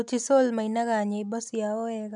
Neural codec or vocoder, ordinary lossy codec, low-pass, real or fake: none; none; 10.8 kHz; real